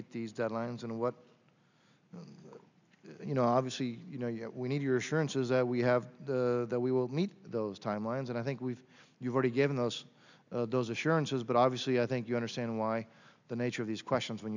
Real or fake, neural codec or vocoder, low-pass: real; none; 7.2 kHz